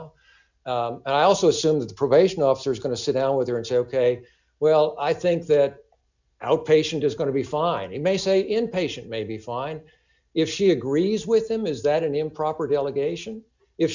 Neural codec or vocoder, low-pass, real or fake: none; 7.2 kHz; real